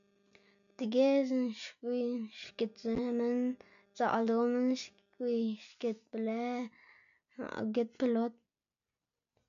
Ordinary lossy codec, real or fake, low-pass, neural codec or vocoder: MP3, 96 kbps; real; 7.2 kHz; none